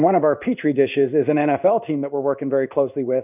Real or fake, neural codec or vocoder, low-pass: fake; codec, 16 kHz in and 24 kHz out, 1 kbps, XY-Tokenizer; 3.6 kHz